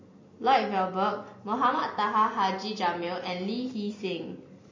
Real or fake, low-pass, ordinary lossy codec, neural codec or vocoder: real; 7.2 kHz; MP3, 32 kbps; none